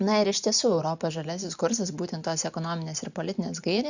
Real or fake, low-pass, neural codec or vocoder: real; 7.2 kHz; none